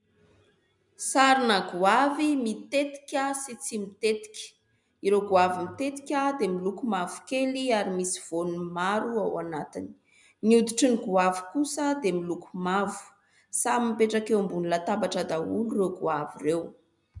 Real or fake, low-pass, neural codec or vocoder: real; 10.8 kHz; none